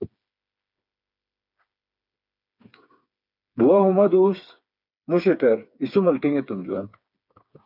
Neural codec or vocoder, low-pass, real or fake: codec, 16 kHz, 4 kbps, FreqCodec, smaller model; 5.4 kHz; fake